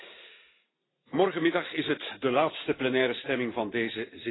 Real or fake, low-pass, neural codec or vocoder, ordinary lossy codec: real; 7.2 kHz; none; AAC, 16 kbps